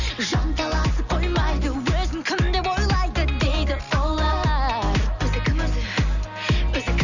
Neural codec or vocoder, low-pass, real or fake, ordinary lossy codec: none; 7.2 kHz; real; none